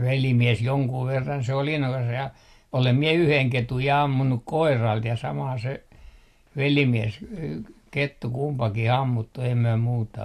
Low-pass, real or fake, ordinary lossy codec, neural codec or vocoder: 14.4 kHz; real; AAC, 64 kbps; none